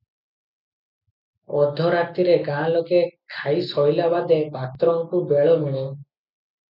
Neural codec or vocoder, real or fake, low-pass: none; real; 5.4 kHz